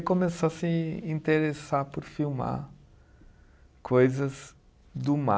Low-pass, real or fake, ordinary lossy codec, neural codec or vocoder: none; real; none; none